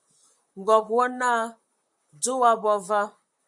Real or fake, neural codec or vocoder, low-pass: fake; vocoder, 44.1 kHz, 128 mel bands, Pupu-Vocoder; 10.8 kHz